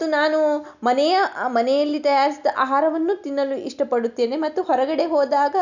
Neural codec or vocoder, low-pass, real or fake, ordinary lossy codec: none; 7.2 kHz; real; none